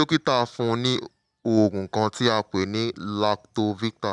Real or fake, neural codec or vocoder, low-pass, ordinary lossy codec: real; none; 10.8 kHz; none